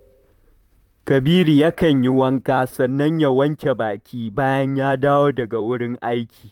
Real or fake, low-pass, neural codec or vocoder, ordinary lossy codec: fake; 19.8 kHz; vocoder, 44.1 kHz, 128 mel bands, Pupu-Vocoder; none